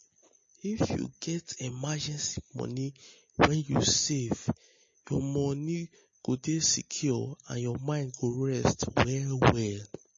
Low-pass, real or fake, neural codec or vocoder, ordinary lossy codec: 7.2 kHz; real; none; MP3, 32 kbps